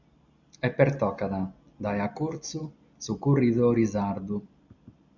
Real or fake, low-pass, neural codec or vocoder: real; 7.2 kHz; none